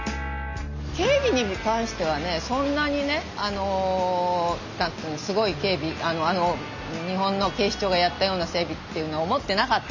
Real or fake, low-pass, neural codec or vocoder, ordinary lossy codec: real; 7.2 kHz; none; none